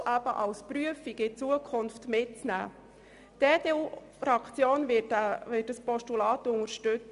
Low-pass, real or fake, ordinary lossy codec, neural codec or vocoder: 10.8 kHz; real; none; none